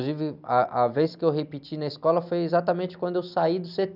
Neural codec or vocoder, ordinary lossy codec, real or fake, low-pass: none; none; real; 5.4 kHz